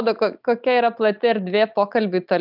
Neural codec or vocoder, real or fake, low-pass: none; real; 5.4 kHz